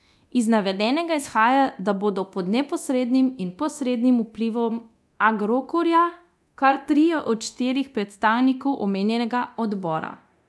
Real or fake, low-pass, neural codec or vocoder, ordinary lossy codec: fake; none; codec, 24 kHz, 0.9 kbps, DualCodec; none